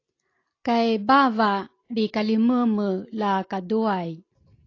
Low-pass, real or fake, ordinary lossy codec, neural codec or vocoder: 7.2 kHz; real; AAC, 32 kbps; none